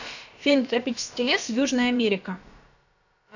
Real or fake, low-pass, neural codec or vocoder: fake; 7.2 kHz; codec, 16 kHz, about 1 kbps, DyCAST, with the encoder's durations